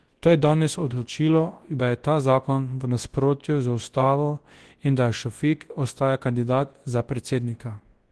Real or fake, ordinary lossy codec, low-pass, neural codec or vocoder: fake; Opus, 16 kbps; 10.8 kHz; codec, 24 kHz, 0.9 kbps, WavTokenizer, large speech release